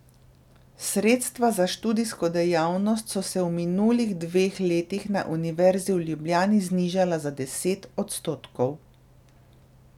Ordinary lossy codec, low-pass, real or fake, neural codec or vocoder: none; 19.8 kHz; real; none